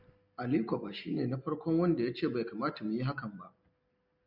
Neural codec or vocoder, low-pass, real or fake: none; 5.4 kHz; real